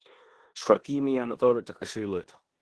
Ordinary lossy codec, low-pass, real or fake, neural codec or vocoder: Opus, 16 kbps; 10.8 kHz; fake; codec, 16 kHz in and 24 kHz out, 0.9 kbps, LongCat-Audio-Codec, four codebook decoder